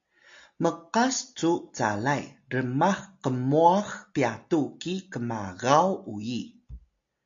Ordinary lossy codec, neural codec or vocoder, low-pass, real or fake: AAC, 64 kbps; none; 7.2 kHz; real